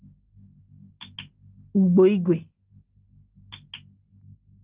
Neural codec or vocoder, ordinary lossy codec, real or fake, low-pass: none; Opus, 32 kbps; real; 3.6 kHz